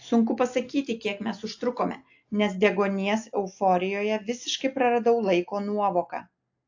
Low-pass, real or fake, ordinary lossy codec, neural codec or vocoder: 7.2 kHz; real; AAC, 48 kbps; none